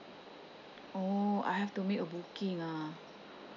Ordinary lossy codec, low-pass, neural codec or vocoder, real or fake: MP3, 64 kbps; 7.2 kHz; none; real